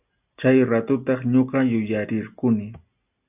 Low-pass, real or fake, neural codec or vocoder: 3.6 kHz; real; none